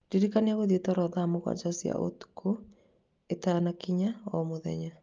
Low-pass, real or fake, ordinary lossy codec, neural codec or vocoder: 7.2 kHz; real; Opus, 24 kbps; none